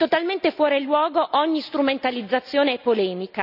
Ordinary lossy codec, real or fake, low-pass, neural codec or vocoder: none; real; 5.4 kHz; none